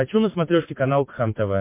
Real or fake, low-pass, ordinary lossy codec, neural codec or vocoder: real; 3.6 kHz; MP3, 24 kbps; none